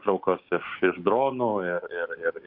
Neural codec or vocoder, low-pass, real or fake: codec, 16 kHz, 6 kbps, DAC; 5.4 kHz; fake